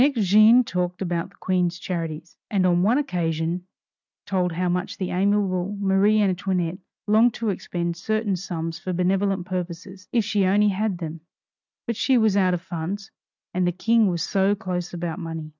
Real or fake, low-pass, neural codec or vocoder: real; 7.2 kHz; none